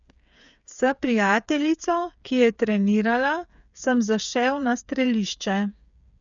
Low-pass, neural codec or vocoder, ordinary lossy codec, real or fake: 7.2 kHz; codec, 16 kHz, 8 kbps, FreqCodec, smaller model; none; fake